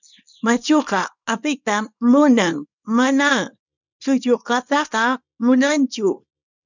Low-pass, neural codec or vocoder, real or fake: 7.2 kHz; codec, 24 kHz, 0.9 kbps, WavTokenizer, small release; fake